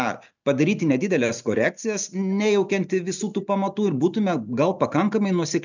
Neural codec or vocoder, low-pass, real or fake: none; 7.2 kHz; real